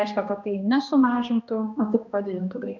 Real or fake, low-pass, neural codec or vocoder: fake; 7.2 kHz; codec, 16 kHz, 1 kbps, X-Codec, HuBERT features, trained on balanced general audio